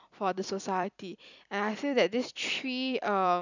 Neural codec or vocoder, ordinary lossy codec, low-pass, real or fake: vocoder, 44.1 kHz, 128 mel bands every 256 samples, BigVGAN v2; none; 7.2 kHz; fake